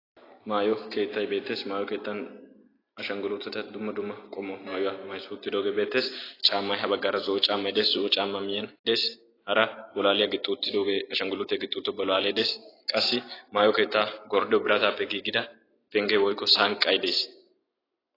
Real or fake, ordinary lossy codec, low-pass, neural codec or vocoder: real; AAC, 24 kbps; 5.4 kHz; none